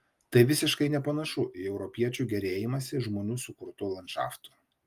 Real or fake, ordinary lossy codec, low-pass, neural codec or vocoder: real; Opus, 32 kbps; 14.4 kHz; none